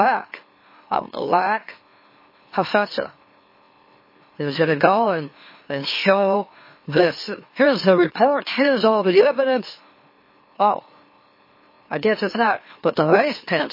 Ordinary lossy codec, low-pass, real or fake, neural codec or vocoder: MP3, 24 kbps; 5.4 kHz; fake; autoencoder, 44.1 kHz, a latent of 192 numbers a frame, MeloTTS